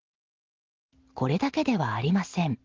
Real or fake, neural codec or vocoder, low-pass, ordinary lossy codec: real; none; 7.2 kHz; Opus, 16 kbps